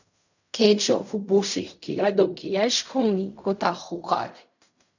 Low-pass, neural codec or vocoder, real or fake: 7.2 kHz; codec, 16 kHz in and 24 kHz out, 0.4 kbps, LongCat-Audio-Codec, fine tuned four codebook decoder; fake